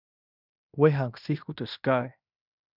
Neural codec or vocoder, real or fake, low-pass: codec, 16 kHz in and 24 kHz out, 0.9 kbps, LongCat-Audio-Codec, fine tuned four codebook decoder; fake; 5.4 kHz